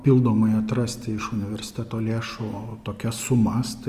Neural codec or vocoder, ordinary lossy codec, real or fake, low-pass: none; Opus, 32 kbps; real; 14.4 kHz